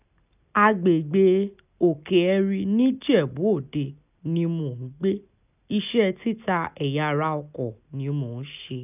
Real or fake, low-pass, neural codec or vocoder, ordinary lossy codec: real; 3.6 kHz; none; none